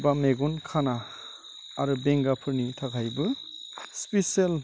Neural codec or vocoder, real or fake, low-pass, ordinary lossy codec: none; real; none; none